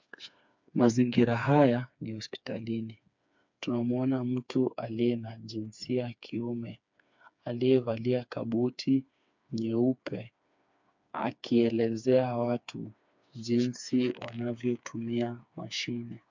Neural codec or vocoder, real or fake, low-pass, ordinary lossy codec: codec, 16 kHz, 4 kbps, FreqCodec, smaller model; fake; 7.2 kHz; MP3, 64 kbps